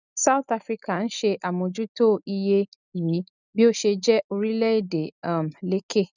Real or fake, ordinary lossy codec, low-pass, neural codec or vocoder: real; none; 7.2 kHz; none